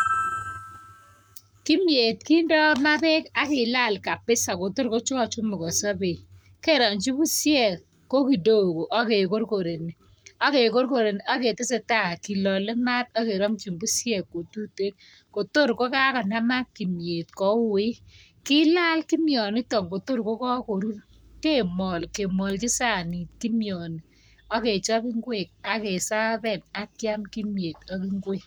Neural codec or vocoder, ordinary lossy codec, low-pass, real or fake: codec, 44.1 kHz, 7.8 kbps, Pupu-Codec; none; none; fake